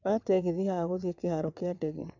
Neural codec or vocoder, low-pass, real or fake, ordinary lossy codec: vocoder, 22.05 kHz, 80 mel bands, WaveNeXt; 7.2 kHz; fake; none